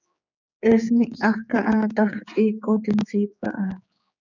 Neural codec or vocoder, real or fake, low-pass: codec, 16 kHz, 4 kbps, X-Codec, HuBERT features, trained on general audio; fake; 7.2 kHz